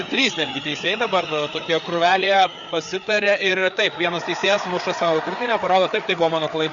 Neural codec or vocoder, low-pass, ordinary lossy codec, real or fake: codec, 16 kHz, 4 kbps, FreqCodec, larger model; 7.2 kHz; Opus, 64 kbps; fake